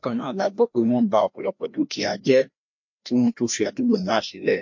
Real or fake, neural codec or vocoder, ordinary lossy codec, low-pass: fake; codec, 16 kHz, 1 kbps, FreqCodec, larger model; MP3, 48 kbps; 7.2 kHz